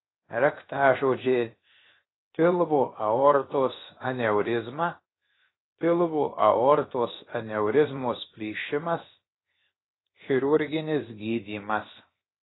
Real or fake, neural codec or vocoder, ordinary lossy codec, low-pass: fake; codec, 16 kHz, 0.7 kbps, FocalCodec; AAC, 16 kbps; 7.2 kHz